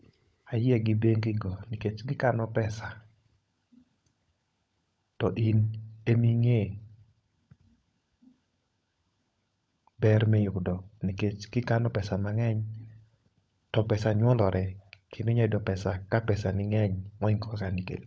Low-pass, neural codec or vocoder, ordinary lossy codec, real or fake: none; codec, 16 kHz, 16 kbps, FunCodec, trained on LibriTTS, 50 frames a second; none; fake